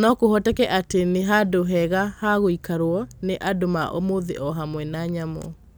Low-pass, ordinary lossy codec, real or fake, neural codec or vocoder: none; none; real; none